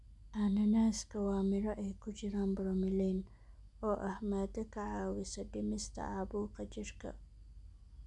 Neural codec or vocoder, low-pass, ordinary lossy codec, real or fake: none; 10.8 kHz; none; real